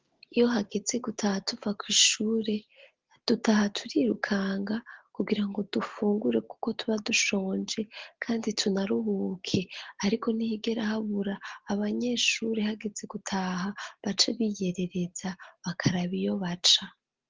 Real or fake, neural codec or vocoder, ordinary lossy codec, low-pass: real; none; Opus, 16 kbps; 7.2 kHz